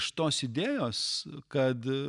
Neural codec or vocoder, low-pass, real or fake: none; 10.8 kHz; real